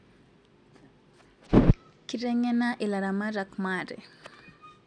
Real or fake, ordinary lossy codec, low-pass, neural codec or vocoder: real; none; 9.9 kHz; none